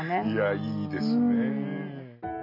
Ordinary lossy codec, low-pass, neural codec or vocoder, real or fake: none; 5.4 kHz; none; real